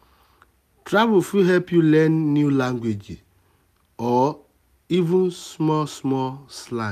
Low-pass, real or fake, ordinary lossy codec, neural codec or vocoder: 14.4 kHz; real; none; none